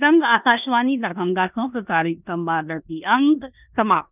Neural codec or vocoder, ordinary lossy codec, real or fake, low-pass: codec, 16 kHz in and 24 kHz out, 0.9 kbps, LongCat-Audio-Codec, four codebook decoder; none; fake; 3.6 kHz